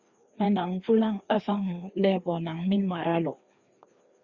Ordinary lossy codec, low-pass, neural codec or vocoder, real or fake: Opus, 64 kbps; 7.2 kHz; codec, 16 kHz, 2 kbps, FreqCodec, larger model; fake